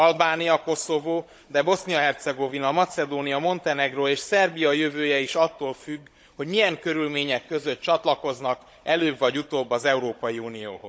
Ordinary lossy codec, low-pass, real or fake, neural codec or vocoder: none; none; fake; codec, 16 kHz, 16 kbps, FunCodec, trained on Chinese and English, 50 frames a second